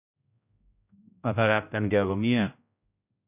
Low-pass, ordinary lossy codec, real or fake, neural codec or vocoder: 3.6 kHz; none; fake; codec, 16 kHz, 0.5 kbps, X-Codec, HuBERT features, trained on general audio